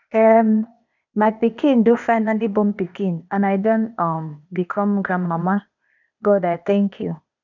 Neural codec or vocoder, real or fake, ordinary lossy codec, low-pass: codec, 16 kHz, 0.8 kbps, ZipCodec; fake; none; 7.2 kHz